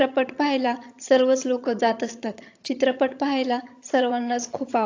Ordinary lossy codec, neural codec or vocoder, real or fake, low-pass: AAC, 48 kbps; vocoder, 22.05 kHz, 80 mel bands, HiFi-GAN; fake; 7.2 kHz